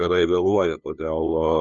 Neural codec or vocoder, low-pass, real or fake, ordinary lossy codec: codec, 16 kHz, 2 kbps, FunCodec, trained on LibriTTS, 25 frames a second; 7.2 kHz; fake; MP3, 96 kbps